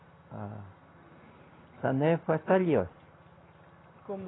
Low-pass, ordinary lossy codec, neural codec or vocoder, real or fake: 7.2 kHz; AAC, 16 kbps; none; real